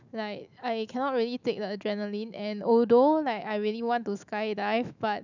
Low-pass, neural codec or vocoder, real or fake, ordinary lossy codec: 7.2 kHz; autoencoder, 48 kHz, 128 numbers a frame, DAC-VAE, trained on Japanese speech; fake; none